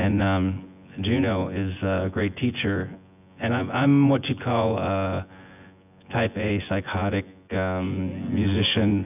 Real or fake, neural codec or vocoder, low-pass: fake; vocoder, 24 kHz, 100 mel bands, Vocos; 3.6 kHz